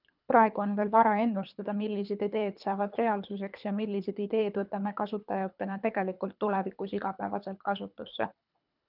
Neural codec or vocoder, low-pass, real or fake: codec, 24 kHz, 3 kbps, HILCodec; 5.4 kHz; fake